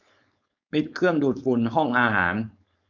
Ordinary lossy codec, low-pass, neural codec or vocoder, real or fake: none; 7.2 kHz; codec, 16 kHz, 4.8 kbps, FACodec; fake